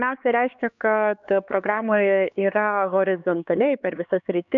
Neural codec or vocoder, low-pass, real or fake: codec, 16 kHz, 4 kbps, X-Codec, HuBERT features, trained on LibriSpeech; 7.2 kHz; fake